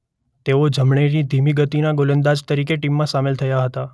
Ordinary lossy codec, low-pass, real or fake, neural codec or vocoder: none; none; real; none